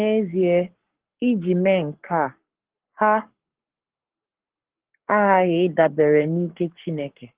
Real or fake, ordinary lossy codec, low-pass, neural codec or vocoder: fake; Opus, 16 kbps; 3.6 kHz; codec, 44.1 kHz, 7.8 kbps, Pupu-Codec